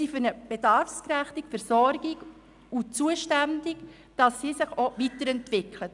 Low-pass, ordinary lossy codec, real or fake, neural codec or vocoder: 10.8 kHz; none; real; none